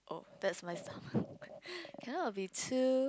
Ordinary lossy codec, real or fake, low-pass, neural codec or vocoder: none; real; none; none